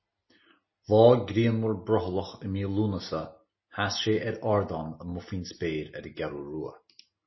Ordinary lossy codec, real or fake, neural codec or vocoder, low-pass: MP3, 24 kbps; real; none; 7.2 kHz